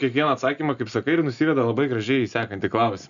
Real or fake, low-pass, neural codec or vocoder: real; 7.2 kHz; none